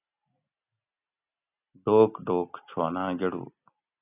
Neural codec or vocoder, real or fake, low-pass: none; real; 3.6 kHz